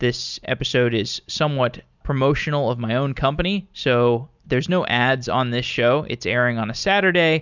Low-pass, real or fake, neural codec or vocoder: 7.2 kHz; real; none